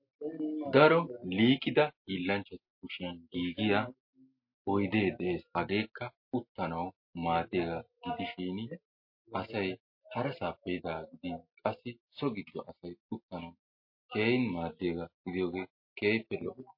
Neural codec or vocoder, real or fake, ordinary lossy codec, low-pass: none; real; MP3, 32 kbps; 5.4 kHz